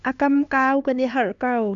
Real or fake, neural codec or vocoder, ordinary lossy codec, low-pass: fake; codec, 16 kHz, 2 kbps, X-Codec, HuBERT features, trained on LibriSpeech; Opus, 64 kbps; 7.2 kHz